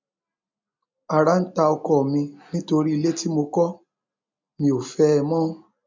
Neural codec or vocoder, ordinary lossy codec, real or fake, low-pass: none; MP3, 64 kbps; real; 7.2 kHz